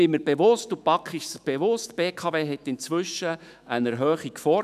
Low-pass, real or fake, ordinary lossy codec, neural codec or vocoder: 14.4 kHz; fake; none; autoencoder, 48 kHz, 128 numbers a frame, DAC-VAE, trained on Japanese speech